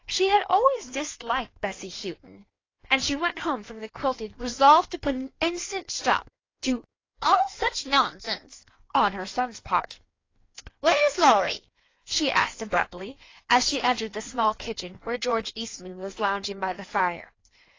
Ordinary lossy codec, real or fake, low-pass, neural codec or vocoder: AAC, 32 kbps; fake; 7.2 kHz; codec, 16 kHz in and 24 kHz out, 1.1 kbps, FireRedTTS-2 codec